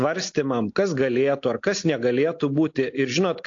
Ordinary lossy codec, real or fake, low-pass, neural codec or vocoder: AAC, 48 kbps; real; 7.2 kHz; none